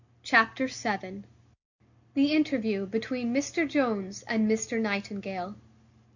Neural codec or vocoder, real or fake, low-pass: none; real; 7.2 kHz